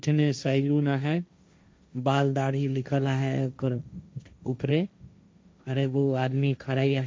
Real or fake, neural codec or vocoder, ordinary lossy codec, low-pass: fake; codec, 16 kHz, 1.1 kbps, Voila-Tokenizer; none; none